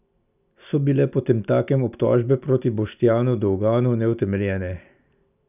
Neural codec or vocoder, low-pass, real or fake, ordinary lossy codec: none; 3.6 kHz; real; none